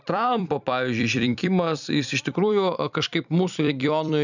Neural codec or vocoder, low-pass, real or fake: vocoder, 44.1 kHz, 128 mel bands every 256 samples, BigVGAN v2; 7.2 kHz; fake